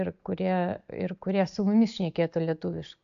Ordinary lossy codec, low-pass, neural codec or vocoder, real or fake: AAC, 64 kbps; 7.2 kHz; none; real